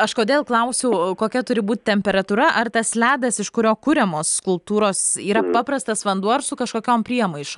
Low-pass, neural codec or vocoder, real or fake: 10.8 kHz; none; real